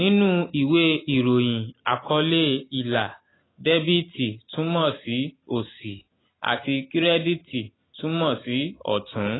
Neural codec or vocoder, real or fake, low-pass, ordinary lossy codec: none; real; 7.2 kHz; AAC, 16 kbps